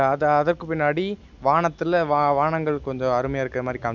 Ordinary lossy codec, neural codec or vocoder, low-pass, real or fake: none; none; 7.2 kHz; real